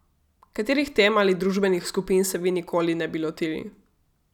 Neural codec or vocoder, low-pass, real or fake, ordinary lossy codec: none; 19.8 kHz; real; none